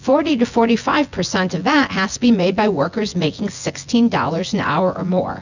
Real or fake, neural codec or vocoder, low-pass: fake; vocoder, 24 kHz, 100 mel bands, Vocos; 7.2 kHz